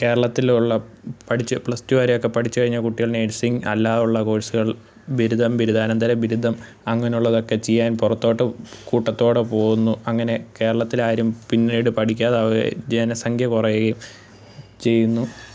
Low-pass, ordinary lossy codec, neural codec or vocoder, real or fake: none; none; none; real